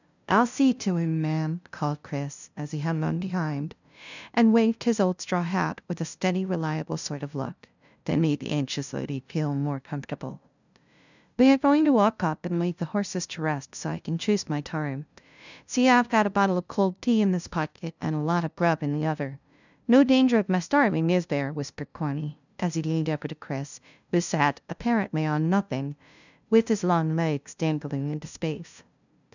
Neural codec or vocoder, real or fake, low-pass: codec, 16 kHz, 0.5 kbps, FunCodec, trained on LibriTTS, 25 frames a second; fake; 7.2 kHz